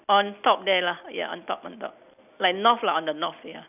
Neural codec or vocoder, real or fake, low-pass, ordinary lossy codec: none; real; 3.6 kHz; none